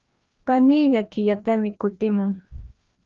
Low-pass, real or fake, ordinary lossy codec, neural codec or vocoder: 7.2 kHz; fake; Opus, 32 kbps; codec, 16 kHz, 1 kbps, FreqCodec, larger model